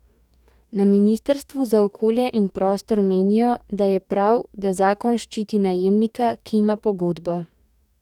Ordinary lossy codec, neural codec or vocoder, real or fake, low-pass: none; codec, 44.1 kHz, 2.6 kbps, DAC; fake; 19.8 kHz